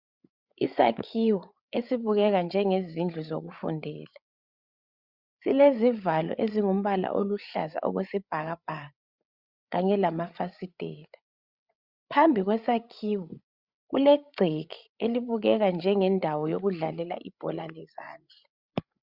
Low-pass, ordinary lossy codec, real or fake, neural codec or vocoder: 5.4 kHz; Opus, 64 kbps; fake; codec, 16 kHz, 8 kbps, FreqCodec, larger model